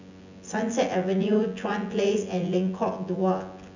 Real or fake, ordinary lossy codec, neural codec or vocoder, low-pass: fake; none; vocoder, 24 kHz, 100 mel bands, Vocos; 7.2 kHz